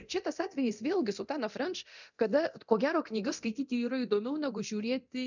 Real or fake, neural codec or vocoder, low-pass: fake; codec, 24 kHz, 0.9 kbps, DualCodec; 7.2 kHz